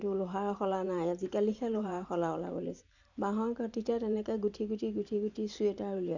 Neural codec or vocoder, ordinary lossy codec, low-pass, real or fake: vocoder, 44.1 kHz, 128 mel bands every 512 samples, BigVGAN v2; none; 7.2 kHz; fake